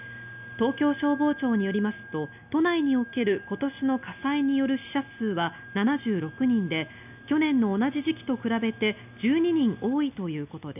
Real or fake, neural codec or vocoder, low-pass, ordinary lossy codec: real; none; 3.6 kHz; none